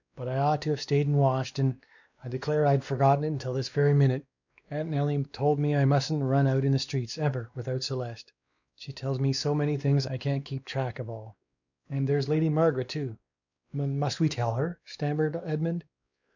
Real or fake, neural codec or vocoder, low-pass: fake; codec, 16 kHz, 2 kbps, X-Codec, WavLM features, trained on Multilingual LibriSpeech; 7.2 kHz